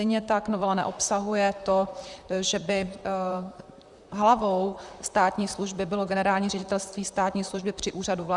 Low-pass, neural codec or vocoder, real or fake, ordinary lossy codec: 10.8 kHz; vocoder, 44.1 kHz, 128 mel bands, Pupu-Vocoder; fake; Opus, 64 kbps